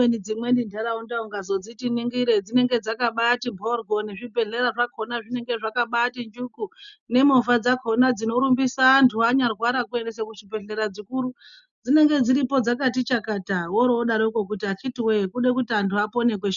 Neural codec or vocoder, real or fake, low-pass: none; real; 7.2 kHz